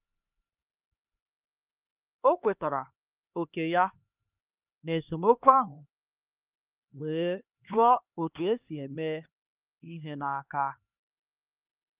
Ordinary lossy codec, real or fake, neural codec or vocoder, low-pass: Opus, 24 kbps; fake; codec, 16 kHz, 2 kbps, X-Codec, HuBERT features, trained on LibriSpeech; 3.6 kHz